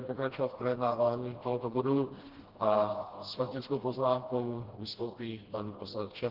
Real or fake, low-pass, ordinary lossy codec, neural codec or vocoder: fake; 5.4 kHz; Opus, 16 kbps; codec, 16 kHz, 1 kbps, FreqCodec, smaller model